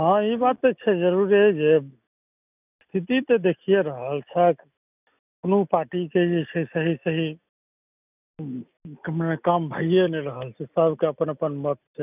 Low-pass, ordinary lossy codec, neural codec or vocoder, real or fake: 3.6 kHz; none; none; real